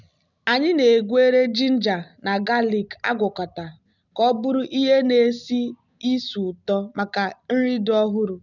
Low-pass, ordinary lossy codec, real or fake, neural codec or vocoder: 7.2 kHz; none; real; none